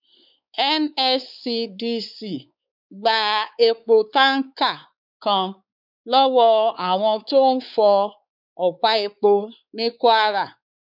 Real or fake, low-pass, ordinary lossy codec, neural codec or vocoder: fake; 5.4 kHz; none; codec, 16 kHz, 4 kbps, X-Codec, WavLM features, trained on Multilingual LibriSpeech